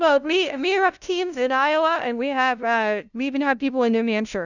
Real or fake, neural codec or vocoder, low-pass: fake; codec, 16 kHz, 0.5 kbps, FunCodec, trained on LibriTTS, 25 frames a second; 7.2 kHz